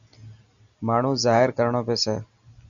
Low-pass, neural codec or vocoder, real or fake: 7.2 kHz; none; real